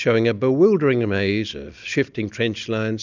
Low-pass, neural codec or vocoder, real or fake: 7.2 kHz; none; real